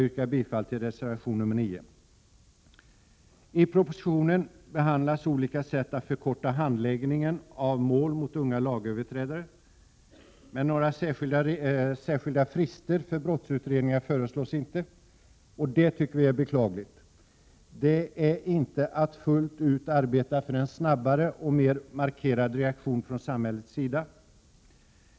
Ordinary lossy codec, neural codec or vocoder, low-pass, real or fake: none; none; none; real